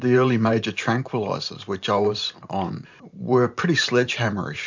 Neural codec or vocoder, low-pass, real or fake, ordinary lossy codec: vocoder, 44.1 kHz, 128 mel bands every 512 samples, BigVGAN v2; 7.2 kHz; fake; MP3, 64 kbps